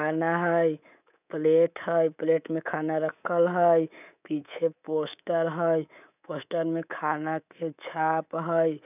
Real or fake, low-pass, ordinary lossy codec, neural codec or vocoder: fake; 3.6 kHz; none; codec, 24 kHz, 3.1 kbps, DualCodec